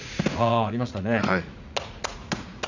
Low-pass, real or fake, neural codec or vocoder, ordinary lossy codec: 7.2 kHz; fake; vocoder, 44.1 kHz, 80 mel bands, Vocos; none